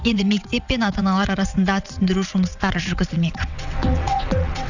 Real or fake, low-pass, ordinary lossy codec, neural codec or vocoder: fake; 7.2 kHz; none; vocoder, 22.05 kHz, 80 mel bands, WaveNeXt